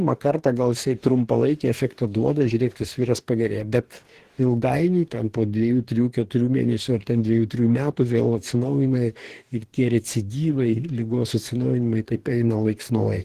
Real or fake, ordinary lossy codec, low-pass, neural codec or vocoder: fake; Opus, 16 kbps; 14.4 kHz; codec, 44.1 kHz, 2.6 kbps, DAC